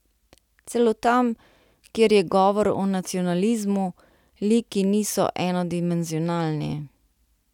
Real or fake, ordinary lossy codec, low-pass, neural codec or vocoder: real; none; 19.8 kHz; none